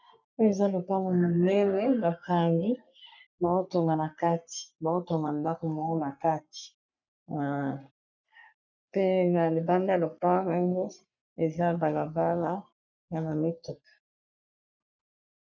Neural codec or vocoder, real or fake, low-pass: codec, 44.1 kHz, 3.4 kbps, Pupu-Codec; fake; 7.2 kHz